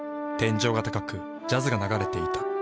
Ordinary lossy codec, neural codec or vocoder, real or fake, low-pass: none; none; real; none